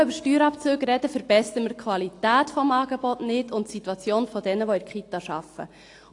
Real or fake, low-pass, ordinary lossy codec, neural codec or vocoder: real; 10.8 kHz; AAC, 48 kbps; none